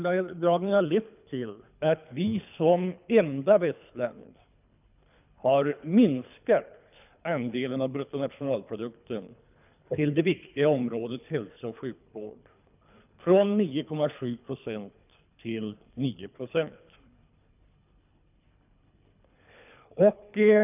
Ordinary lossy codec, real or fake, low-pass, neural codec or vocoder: none; fake; 3.6 kHz; codec, 24 kHz, 3 kbps, HILCodec